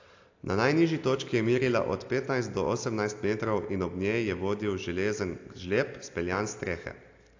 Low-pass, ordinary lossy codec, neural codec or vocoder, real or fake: 7.2 kHz; AAC, 48 kbps; none; real